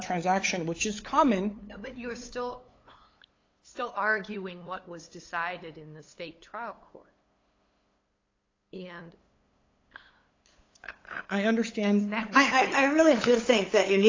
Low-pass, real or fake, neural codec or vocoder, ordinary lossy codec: 7.2 kHz; fake; codec, 16 kHz, 8 kbps, FunCodec, trained on LibriTTS, 25 frames a second; AAC, 32 kbps